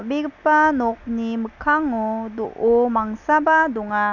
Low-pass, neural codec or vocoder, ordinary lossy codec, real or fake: 7.2 kHz; none; none; real